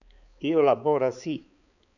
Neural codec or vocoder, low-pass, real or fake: codec, 16 kHz, 4 kbps, X-Codec, HuBERT features, trained on balanced general audio; 7.2 kHz; fake